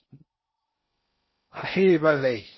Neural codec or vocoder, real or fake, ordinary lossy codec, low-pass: codec, 16 kHz in and 24 kHz out, 0.6 kbps, FocalCodec, streaming, 4096 codes; fake; MP3, 24 kbps; 7.2 kHz